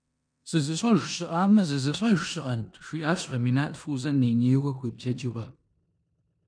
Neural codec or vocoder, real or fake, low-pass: codec, 16 kHz in and 24 kHz out, 0.9 kbps, LongCat-Audio-Codec, four codebook decoder; fake; 9.9 kHz